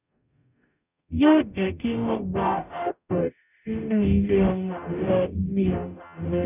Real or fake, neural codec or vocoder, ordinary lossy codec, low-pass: fake; codec, 44.1 kHz, 0.9 kbps, DAC; none; 3.6 kHz